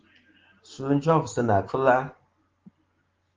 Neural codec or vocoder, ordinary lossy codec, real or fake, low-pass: none; Opus, 16 kbps; real; 7.2 kHz